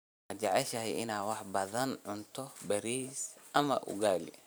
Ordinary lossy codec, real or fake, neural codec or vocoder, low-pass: none; fake; vocoder, 44.1 kHz, 128 mel bands every 512 samples, BigVGAN v2; none